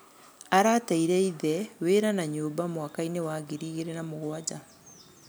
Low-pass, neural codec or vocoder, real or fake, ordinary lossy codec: none; none; real; none